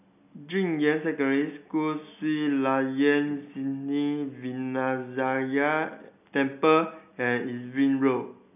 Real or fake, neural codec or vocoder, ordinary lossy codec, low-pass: real; none; none; 3.6 kHz